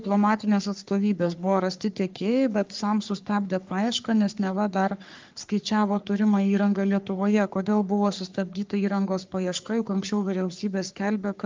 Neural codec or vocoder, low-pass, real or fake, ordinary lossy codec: codec, 44.1 kHz, 3.4 kbps, Pupu-Codec; 7.2 kHz; fake; Opus, 24 kbps